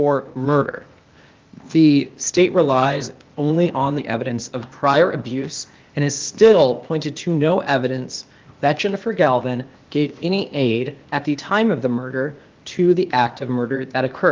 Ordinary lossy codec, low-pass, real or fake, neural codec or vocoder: Opus, 32 kbps; 7.2 kHz; fake; codec, 16 kHz, 0.8 kbps, ZipCodec